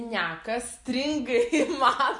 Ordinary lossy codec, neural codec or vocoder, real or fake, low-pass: MP3, 64 kbps; none; real; 10.8 kHz